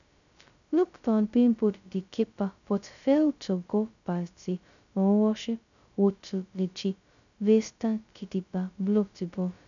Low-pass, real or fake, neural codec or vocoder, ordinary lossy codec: 7.2 kHz; fake; codec, 16 kHz, 0.2 kbps, FocalCodec; none